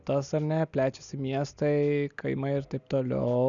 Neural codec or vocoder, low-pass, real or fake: none; 7.2 kHz; real